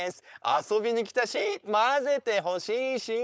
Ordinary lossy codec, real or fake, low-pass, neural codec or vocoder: none; fake; none; codec, 16 kHz, 4.8 kbps, FACodec